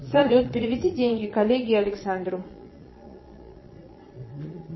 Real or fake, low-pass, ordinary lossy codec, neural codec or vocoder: fake; 7.2 kHz; MP3, 24 kbps; vocoder, 22.05 kHz, 80 mel bands, Vocos